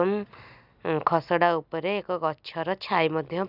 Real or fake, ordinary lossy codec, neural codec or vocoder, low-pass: real; none; none; 5.4 kHz